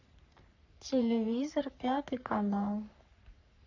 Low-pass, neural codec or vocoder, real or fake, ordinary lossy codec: 7.2 kHz; codec, 44.1 kHz, 3.4 kbps, Pupu-Codec; fake; AAC, 48 kbps